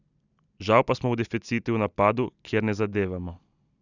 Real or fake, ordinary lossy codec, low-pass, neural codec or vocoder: real; none; 7.2 kHz; none